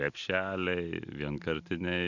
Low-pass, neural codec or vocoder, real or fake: 7.2 kHz; none; real